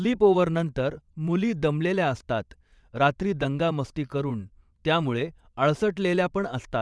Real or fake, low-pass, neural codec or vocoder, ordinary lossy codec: fake; none; vocoder, 22.05 kHz, 80 mel bands, WaveNeXt; none